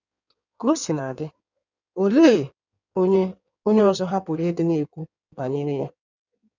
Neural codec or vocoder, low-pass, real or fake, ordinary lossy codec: codec, 16 kHz in and 24 kHz out, 1.1 kbps, FireRedTTS-2 codec; 7.2 kHz; fake; none